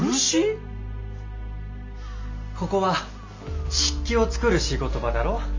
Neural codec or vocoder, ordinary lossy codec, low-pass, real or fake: none; MP3, 64 kbps; 7.2 kHz; real